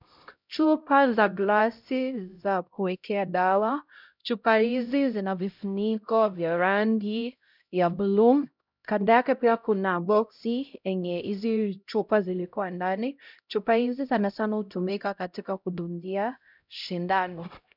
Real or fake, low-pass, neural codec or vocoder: fake; 5.4 kHz; codec, 16 kHz, 0.5 kbps, X-Codec, HuBERT features, trained on LibriSpeech